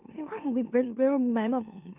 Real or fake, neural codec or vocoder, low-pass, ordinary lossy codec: fake; autoencoder, 44.1 kHz, a latent of 192 numbers a frame, MeloTTS; 3.6 kHz; none